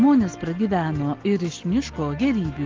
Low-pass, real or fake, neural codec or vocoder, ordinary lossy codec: 7.2 kHz; real; none; Opus, 16 kbps